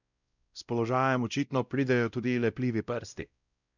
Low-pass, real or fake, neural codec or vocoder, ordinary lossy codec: 7.2 kHz; fake; codec, 16 kHz, 0.5 kbps, X-Codec, WavLM features, trained on Multilingual LibriSpeech; none